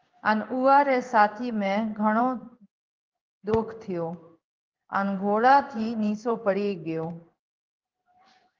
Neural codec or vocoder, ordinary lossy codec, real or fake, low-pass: codec, 16 kHz in and 24 kHz out, 1 kbps, XY-Tokenizer; Opus, 24 kbps; fake; 7.2 kHz